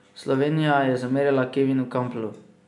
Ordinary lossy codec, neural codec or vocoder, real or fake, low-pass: MP3, 96 kbps; none; real; 10.8 kHz